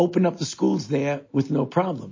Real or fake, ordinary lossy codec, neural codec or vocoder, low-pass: real; MP3, 32 kbps; none; 7.2 kHz